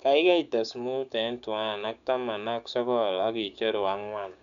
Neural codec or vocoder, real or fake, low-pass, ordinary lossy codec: codec, 16 kHz, 6 kbps, DAC; fake; 7.2 kHz; none